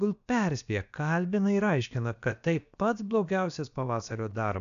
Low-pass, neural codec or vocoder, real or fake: 7.2 kHz; codec, 16 kHz, about 1 kbps, DyCAST, with the encoder's durations; fake